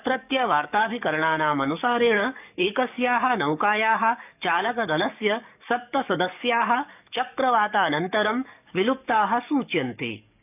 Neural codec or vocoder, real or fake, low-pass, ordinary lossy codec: codec, 44.1 kHz, 7.8 kbps, DAC; fake; 3.6 kHz; none